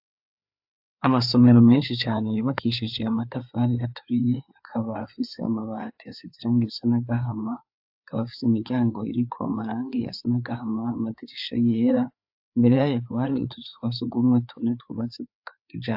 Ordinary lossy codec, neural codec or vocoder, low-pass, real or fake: AAC, 48 kbps; codec, 16 kHz, 4 kbps, FreqCodec, larger model; 5.4 kHz; fake